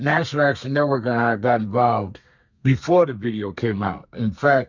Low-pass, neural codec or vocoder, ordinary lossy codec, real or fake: 7.2 kHz; codec, 32 kHz, 1.9 kbps, SNAC; Opus, 64 kbps; fake